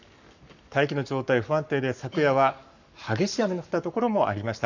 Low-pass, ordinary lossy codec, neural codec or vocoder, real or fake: 7.2 kHz; none; codec, 44.1 kHz, 7.8 kbps, Pupu-Codec; fake